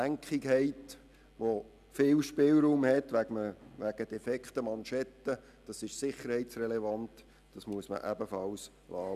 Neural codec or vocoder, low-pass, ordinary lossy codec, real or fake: none; 14.4 kHz; none; real